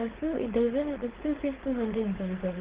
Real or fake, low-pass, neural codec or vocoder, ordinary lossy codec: fake; 3.6 kHz; codec, 16 kHz, 4.8 kbps, FACodec; Opus, 16 kbps